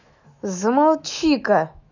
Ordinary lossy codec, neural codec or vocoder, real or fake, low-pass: none; autoencoder, 48 kHz, 128 numbers a frame, DAC-VAE, trained on Japanese speech; fake; 7.2 kHz